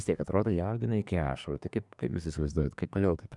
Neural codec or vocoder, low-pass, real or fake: codec, 24 kHz, 1 kbps, SNAC; 10.8 kHz; fake